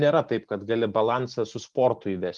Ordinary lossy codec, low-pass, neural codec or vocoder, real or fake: Opus, 32 kbps; 10.8 kHz; none; real